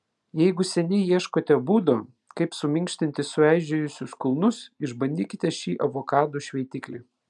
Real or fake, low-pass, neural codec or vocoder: real; 10.8 kHz; none